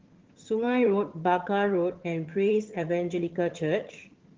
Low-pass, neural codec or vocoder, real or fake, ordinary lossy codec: 7.2 kHz; vocoder, 22.05 kHz, 80 mel bands, HiFi-GAN; fake; Opus, 16 kbps